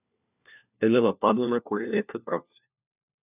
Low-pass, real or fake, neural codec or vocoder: 3.6 kHz; fake; codec, 16 kHz, 1 kbps, FunCodec, trained on LibriTTS, 50 frames a second